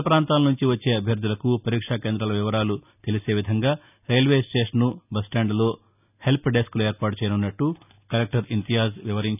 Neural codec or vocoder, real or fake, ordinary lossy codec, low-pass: none; real; none; 3.6 kHz